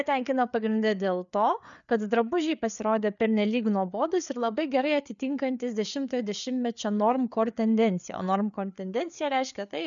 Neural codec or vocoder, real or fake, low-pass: codec, 16 kHz, 4 kbps, FreqCodec, larger model; fake; 7.2 kHz